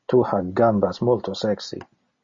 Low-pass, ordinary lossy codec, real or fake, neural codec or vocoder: 7.2 kHz; MP3, 32 kbps; real; none